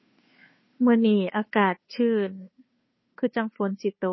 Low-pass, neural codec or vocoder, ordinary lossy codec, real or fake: 7.2 kHz; codec, 16 kHz, 2 kbps, FunCodec, trained on Chinese and English, 25 frames a second; MP3, 24 kbps; fake